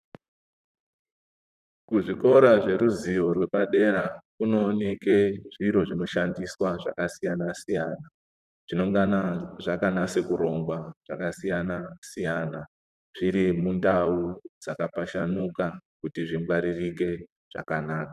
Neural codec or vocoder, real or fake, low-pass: vocoder, 44.1 kHz, 128 mel bands, Pupu-Vocoder; fake; 14.4 kHz